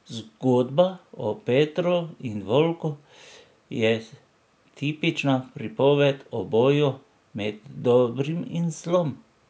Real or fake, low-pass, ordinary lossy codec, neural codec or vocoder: real; none; none; none